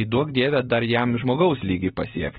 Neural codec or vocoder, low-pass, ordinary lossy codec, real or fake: none; 7.2 kHz; AAC, 16 kbps; real